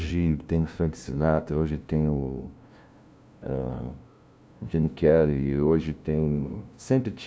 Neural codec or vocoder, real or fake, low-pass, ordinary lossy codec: codec, 16 kHz, 0.5 kbps, FunCodec, trained on LibriTTS, 25 frames a second; fake; none; none